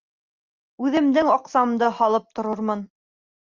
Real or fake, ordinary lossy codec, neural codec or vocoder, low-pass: real; Opus, 32 kbps; none; 7.2 kHz